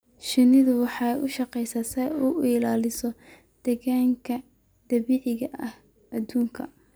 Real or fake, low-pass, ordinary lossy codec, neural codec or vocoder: real; none; none; none